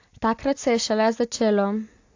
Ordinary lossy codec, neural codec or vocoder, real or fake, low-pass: AAC, 48 kbps; none; real; 7.2 kHz